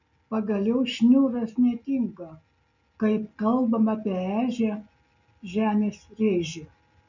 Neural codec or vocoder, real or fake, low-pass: none; real; 7.2 kHz